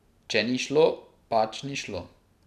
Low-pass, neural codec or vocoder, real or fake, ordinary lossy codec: 14.4 kHz; none; real; none